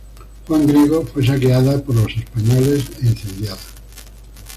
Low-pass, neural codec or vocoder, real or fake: 14.4 kHz; none; real